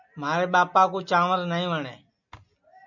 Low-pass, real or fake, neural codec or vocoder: 7.2 kHz; real; none